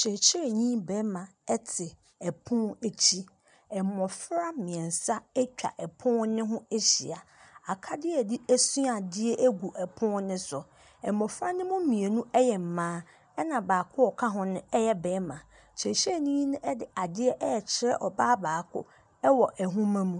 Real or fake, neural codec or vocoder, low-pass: real; none; 9.9 kHz